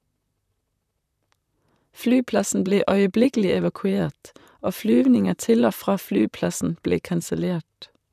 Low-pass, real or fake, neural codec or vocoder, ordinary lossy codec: 14.4 kHz; fake; vocoder, 44.1 kHz, 128 mel bands, Pupu-Vocoder; none